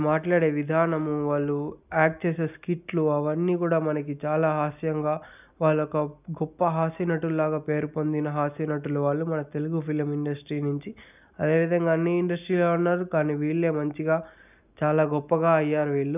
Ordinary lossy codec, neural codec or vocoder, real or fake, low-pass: none; none; real; 3.6 kHz